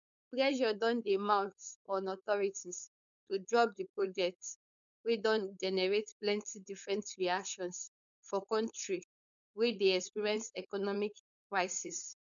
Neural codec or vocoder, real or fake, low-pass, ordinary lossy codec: codec, 16 kHz, 4.8 kbps, FACodec; fake; 7.2 kHz; MP3, 96 kbps